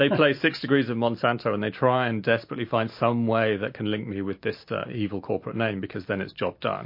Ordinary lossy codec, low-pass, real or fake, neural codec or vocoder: MP3, 32 kbps; 5.4 kHz; real; none